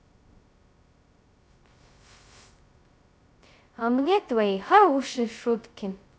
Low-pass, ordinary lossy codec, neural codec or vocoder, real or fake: none; none; codec, 16 kHz, 0.2 kbps, FocalCodec; fake